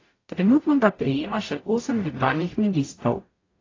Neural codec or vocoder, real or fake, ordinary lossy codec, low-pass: codec, 44.1 kHz, 0.9 kbps, DAC; fake; AAC, 32 kbps; 7.2 kHz